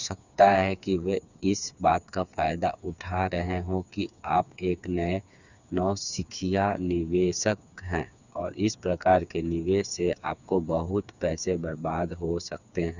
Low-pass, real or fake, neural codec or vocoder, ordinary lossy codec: 7.2 kHz; fake; codec, 16 kHz, 4 kbps, FreqCodec, smaller model; none